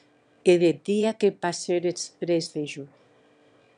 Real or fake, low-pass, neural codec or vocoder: fake; 9.9 kHz; autoencoder, 22.05 kHz, a latent of 192 numbers a frame, VITS, trained on one speaker